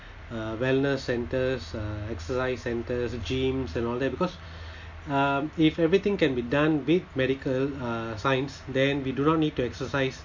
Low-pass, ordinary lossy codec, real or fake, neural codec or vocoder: 7.2 kHz; MP3, 48 kbps; real; none